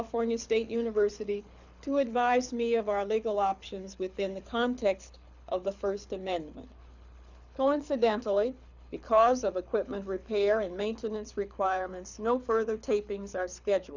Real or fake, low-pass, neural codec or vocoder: fake; 7.2 kHz; codec, 24 kHz, 6 kbps, HILCodec